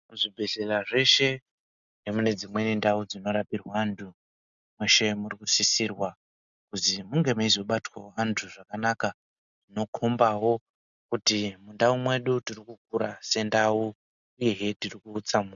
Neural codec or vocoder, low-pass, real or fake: none; 7.2 kHz; real